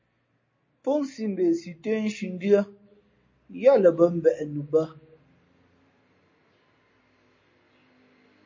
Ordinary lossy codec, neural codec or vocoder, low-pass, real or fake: MP3, 32 kbps; none; 7.2 kHz; real